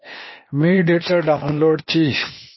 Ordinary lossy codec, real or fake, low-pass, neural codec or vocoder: MP3, 24 kbps; fake; 7.2 kHz; codec, 16 kHz, 0.8 kbps, ZipCodec